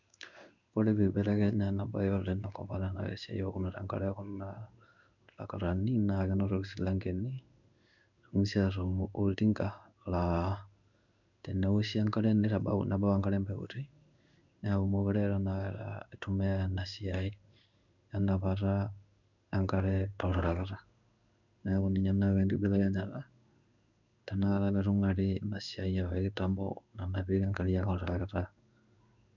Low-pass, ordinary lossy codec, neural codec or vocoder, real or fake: 7.2 kHz; none; codec, 16 kHz in and 24 kHz out, 1 kbps, XY-Tokenizer; fake